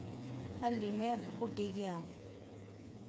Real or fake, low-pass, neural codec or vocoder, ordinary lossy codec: fake; none; codec, 16 kHz, 4 kbps, FreqCodec, smaller model; none